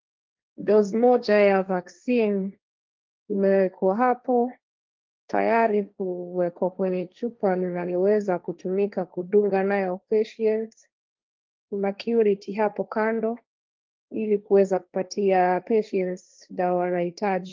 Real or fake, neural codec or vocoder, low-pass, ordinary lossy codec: fake; codec, 16 kHz, 1.1 kbps, Voila-Tokenizer; 7.2 kHz; Opus, 24 kbps